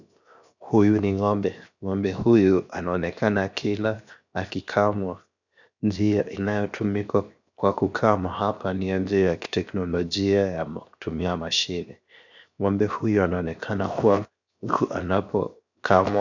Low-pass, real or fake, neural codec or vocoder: 7.2 kHz; fake; codec, 16 kHz, 0.7 kbps, FocalCodec